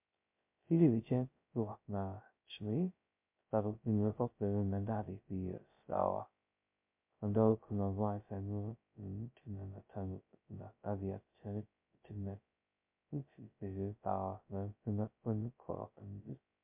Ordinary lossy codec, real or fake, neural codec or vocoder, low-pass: MP3, 32 kbps; fake; codec, 16 kHz, 0.2 kbps, FocalCodec; 3.6 kHz